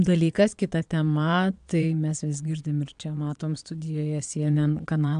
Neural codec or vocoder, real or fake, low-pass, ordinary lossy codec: vocoder, 22.05 kHz, 80 mel bands, Vocos; fake; 9.9 kHz; AAC, 96 kbps